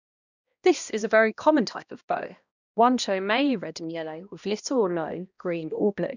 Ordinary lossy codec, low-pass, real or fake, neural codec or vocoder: none; 7.2 kHz; fake; codec, 16 kHz, 1 kbps, X-Codec, HuBERT features, trained on balanced general audio